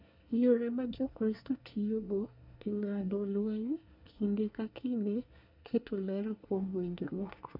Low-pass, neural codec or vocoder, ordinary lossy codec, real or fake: 5.4 kHz; codec, 24 kHz, 1 kbps, SNAC; none; fake